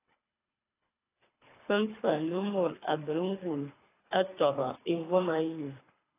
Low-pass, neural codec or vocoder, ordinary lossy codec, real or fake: 3.6 kHz; codec, 24 kHz, 3 kbps, HILCodec; AAC, 16 kbps; fake